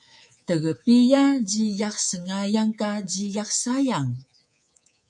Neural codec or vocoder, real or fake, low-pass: codec, 24 kHz, 3.1 kbps, DualCodec; fake; 10.8 kHz